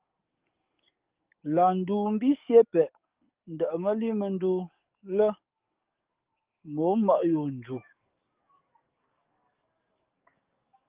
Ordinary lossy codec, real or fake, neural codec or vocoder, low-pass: Opus, 32 kbps; fake; codec, 44.1 kHz, 7.8 kbps, DAC; 3.6 kHz